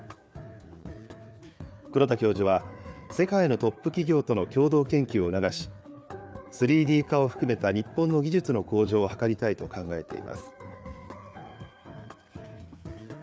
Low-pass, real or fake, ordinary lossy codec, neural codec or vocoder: none; fake; none; codec, 16 kHz, 4 kbps, FreqCodec, larger model